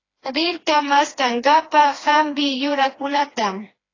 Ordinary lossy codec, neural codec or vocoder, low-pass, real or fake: AAC, 32 kbps; codec, 16 kHz, 2 kbps, FreqCodec, smaller model; 7.2 kHz; fake